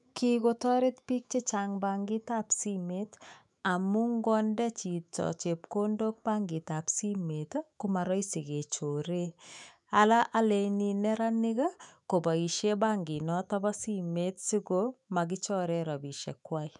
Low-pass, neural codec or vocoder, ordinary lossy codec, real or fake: 10.8 kHz; autoencoder, 48 kHz, 128 numbers a frame, DAC-VAE, trained on Japanese speech; none; fake